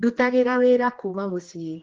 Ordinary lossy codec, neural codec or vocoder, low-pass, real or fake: Opus, 16 kbps; codec, 16 kHz, 2 kbps, X-Codec, HuBERT features, trained on general audio; 7.2 kHz; fake